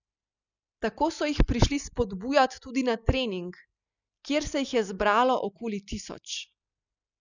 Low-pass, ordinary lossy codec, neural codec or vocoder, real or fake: 7.2 kHz; none; none; real